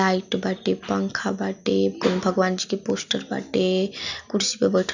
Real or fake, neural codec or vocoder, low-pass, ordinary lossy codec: real; none; 7.2 kHz; none